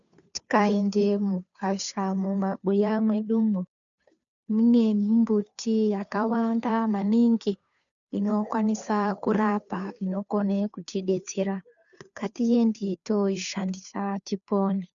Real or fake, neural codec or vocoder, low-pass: fake; codec, 16 kHz, 2 kbps, FunCodec, trained on Chinese and English, 25 frames a second; 7.2 kHz